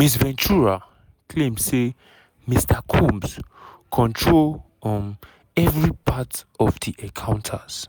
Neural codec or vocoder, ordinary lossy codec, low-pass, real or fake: none; none; none; real